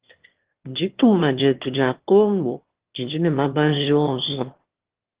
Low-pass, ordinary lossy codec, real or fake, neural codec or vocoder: 3.6 kHz; Opus, 16 kbps; fake; autoencoder, 22.05 kHz, a latent of 192 numbers a frame, VITS, trained on one speaker